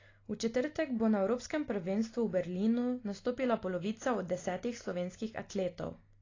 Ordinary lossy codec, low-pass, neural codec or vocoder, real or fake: AAC, 32 kbps; 7.2 kHz; none; real